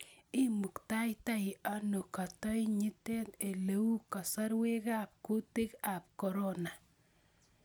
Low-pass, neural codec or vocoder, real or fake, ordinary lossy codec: none; none; real; none